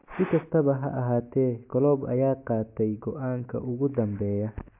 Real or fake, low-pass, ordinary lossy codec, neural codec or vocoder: real; 3.6 kHz; MP3, 32 kbps; none